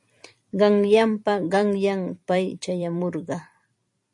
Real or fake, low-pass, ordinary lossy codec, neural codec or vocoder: fake; 10.8 kHz; MP3, 48 kbps; vocoder, 44.1 kHz, 128 mel bands every 256 samples, BigVGAN v2